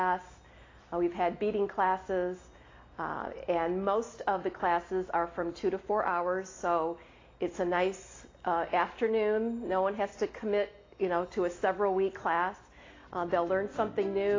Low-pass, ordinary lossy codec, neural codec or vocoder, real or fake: 7.2 kHz; AAC, 32 kbps; none; real